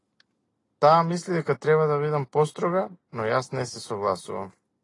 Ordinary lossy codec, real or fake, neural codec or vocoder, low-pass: AAC, 32 kbps; real; none; 10.8 kHz